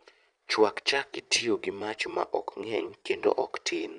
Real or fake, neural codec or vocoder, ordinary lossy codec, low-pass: fake; vocoder, 22.05 kHz, 80 mel bands, Vocos; none; 9.9 kHz